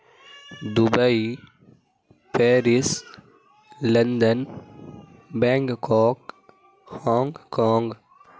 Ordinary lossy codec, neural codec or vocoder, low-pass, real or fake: none; none; none; real